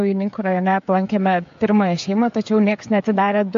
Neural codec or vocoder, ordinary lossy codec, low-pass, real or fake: codec, 16 kHz, 16 kbps, FreqCodec, smaller model; AAC, 64 kbps; 7.2 kHz; fake